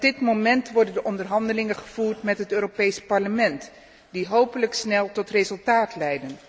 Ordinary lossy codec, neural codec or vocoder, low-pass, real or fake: none; none; none; real